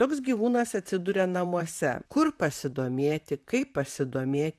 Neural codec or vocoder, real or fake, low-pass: vocoder, 44.1 kHz, 128 mel bands, Pupu-Vocoder; fake; 14.4 kHz